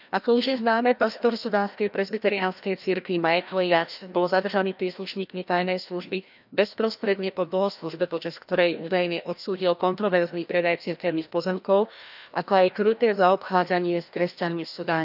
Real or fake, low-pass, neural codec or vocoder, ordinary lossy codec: fake; 5.4 kHz; codec, 16 kHz, 1 kbps, FreqCodec, larger model; none